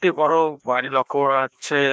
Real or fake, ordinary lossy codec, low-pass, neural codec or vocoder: fake; none; none; codec, 16 kHz, 1 kbps, FreqCodec, larger model